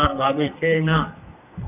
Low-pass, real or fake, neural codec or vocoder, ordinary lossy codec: 3.6 kHz; fake; codec, 32 kHz, 1.9 kbps, SNAC; AAC, 24 kbps